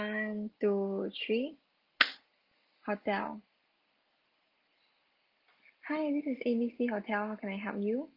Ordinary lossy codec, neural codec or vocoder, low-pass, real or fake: Opus, 32 kbps; none; 5.4 kHz; real